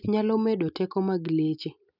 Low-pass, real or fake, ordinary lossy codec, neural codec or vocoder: 5.4 kHz; real; none; none